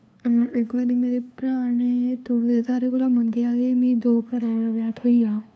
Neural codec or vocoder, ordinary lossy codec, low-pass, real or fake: codec, 16 kHz, 2 kbps, FunCodec, trained on LibriTTS, 25 frames a second; none; none; fake